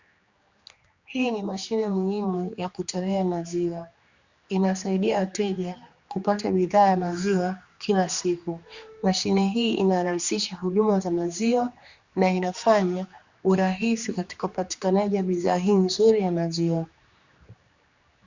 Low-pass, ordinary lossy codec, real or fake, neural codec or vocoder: 7.2 kHz; Opus, 64 kbps; fake; codec, 16 kHz, 2 kbps, X-Codec, HuBERT features, trained on general audio